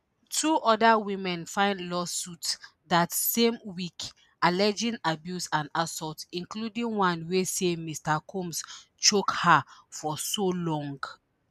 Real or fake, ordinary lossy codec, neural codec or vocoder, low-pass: real; none; none; 14.4 kHz